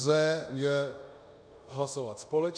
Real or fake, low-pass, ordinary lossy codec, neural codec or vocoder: fake; 9.9 kHz; MP3, 96 kbps; codec, 24 kHz, 0.5 kbps, DualCodec